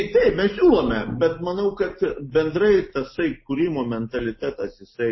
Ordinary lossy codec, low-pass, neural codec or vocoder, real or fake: MP3, 24 kbps; 7.2 kHz; none; real